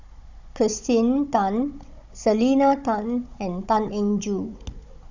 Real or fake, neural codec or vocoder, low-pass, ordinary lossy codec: fake; codec, 16 kHz, 16 kbps, FunCodec, trained on Chinese and English, 50 frames a second; 7.2 kHz; Opus, 64 kbps